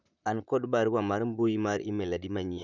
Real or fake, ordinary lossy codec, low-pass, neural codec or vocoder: fake; none; 7.2 kHz; vocoder, 44.1 kHz, 128 mel bands, Pupu-Vocoder